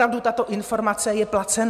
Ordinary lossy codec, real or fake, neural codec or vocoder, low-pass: MP3, 96 kbps; real; none; 14.4 kHz